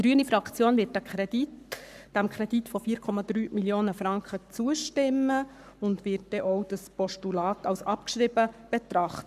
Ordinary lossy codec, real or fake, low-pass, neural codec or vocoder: none; fake; 14.4 kHz; codec, 44.1 kHz, 7.8 kbps, Pupu-Codec